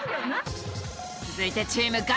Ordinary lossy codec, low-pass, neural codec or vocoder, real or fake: none; none; none; real